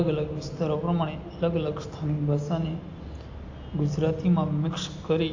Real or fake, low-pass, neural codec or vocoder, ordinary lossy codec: real; 7.2 kHz; none; AAC, 48 kbps